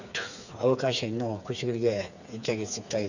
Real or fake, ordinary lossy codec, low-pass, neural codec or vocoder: fake; none; 7.2 kHz; codec, 16 kHz, 4 kbps, FreqCodec, smaller model